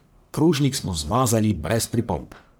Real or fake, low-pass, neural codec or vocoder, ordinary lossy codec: fake; none; codec, 44.1 kHz, 1.7 kbps, Pupu-Codec; none